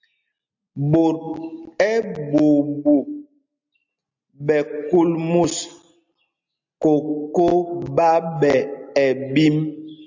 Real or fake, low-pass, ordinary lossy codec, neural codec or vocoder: real; 7.2 kHz; AAC, 48 kbps; none